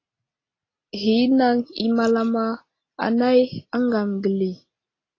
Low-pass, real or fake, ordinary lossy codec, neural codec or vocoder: 7.2 kHz; real; AAC, 32 kbps; none